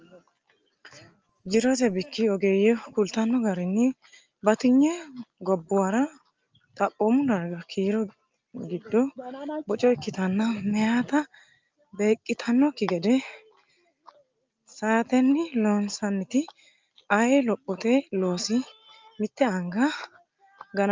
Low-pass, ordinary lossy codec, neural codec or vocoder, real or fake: 7.2 kHz; Opus, 24 kbps; none; real